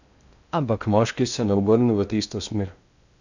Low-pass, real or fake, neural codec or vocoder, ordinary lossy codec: 7.2 kHz; fake; codec, 16 kHz in and 24 kHz out, 0.6 kbps, FocalCodec, streaming, 4096 codes; none